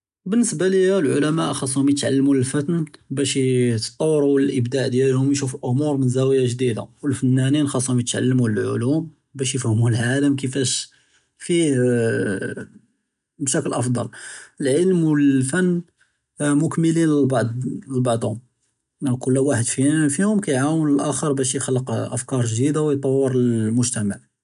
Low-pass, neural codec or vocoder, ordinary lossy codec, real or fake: 10.8 kHz; none; none; real